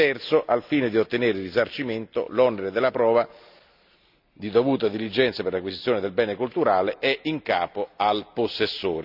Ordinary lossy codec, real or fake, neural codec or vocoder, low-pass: none; real; none; 5.4 kHz